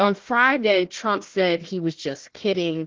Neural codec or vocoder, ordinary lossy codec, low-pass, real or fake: codec, 16 kHz, 1 kbps, FunCodec, trained on Chinese and English, 50 frames a second; Opus, 16 kbps; 7.2 kHz; fake